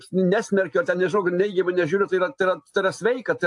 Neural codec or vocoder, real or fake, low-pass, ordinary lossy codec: none; real; 10.8 kHz; AAC, 64 kbps